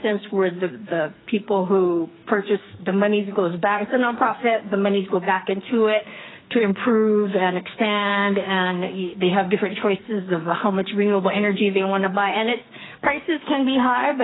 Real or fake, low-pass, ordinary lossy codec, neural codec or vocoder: fake; 7.2 kHz; AAC, 16 kbps; codec, 44.1 kHz, 2.6 kbps, SNAC